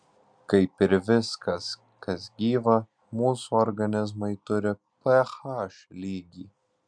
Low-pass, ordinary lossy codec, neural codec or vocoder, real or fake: 9.9 kHz; MP3, 96 kbps; none; real